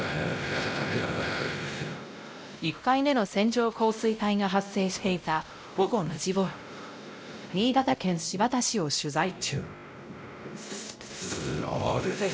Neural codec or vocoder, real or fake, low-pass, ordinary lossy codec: codec, 16 kHz, 0.5 kbps, X-Codec, WavLM features, trained on Multilingual LibriSpeech; fake; none; none